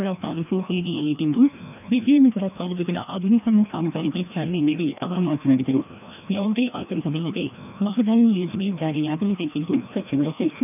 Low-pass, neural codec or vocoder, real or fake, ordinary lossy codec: 3.6 kHz; codec, 16 kHz, 1 kbps, FreqCodec, larger model; fake; none